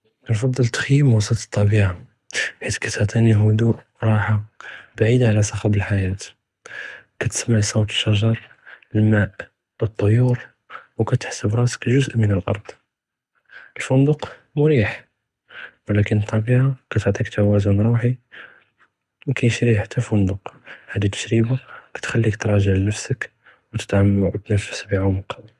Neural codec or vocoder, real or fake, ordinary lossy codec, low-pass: codec, 24 kHz, 6 kbps, HILCodec; fake; none; none